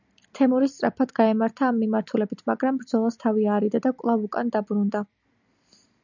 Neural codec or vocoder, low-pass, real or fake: none; 7.2 kHz; real